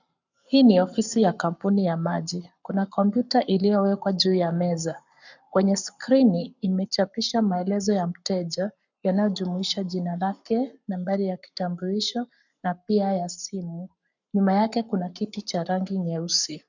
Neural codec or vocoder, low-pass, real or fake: codec, 44.1 kHz, 7.8 kbps, Pupu-Codec; 7.2 kHz; fake